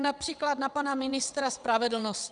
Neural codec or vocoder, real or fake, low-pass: vocoder, 22.05 kHz, 80 mel bands, WaveNeXt; fake; 9.9 kHz